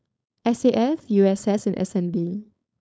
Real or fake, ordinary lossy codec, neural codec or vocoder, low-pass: fake; none; codec, 16 kHz, 4.8 kbps, FACodec; none